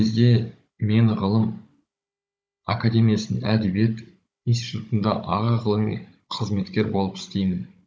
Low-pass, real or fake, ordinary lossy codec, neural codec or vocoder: none; fake; none; codec, 16 kHz, 16 kbps, FunCodec, trained on Chinese and English, 50 frames a second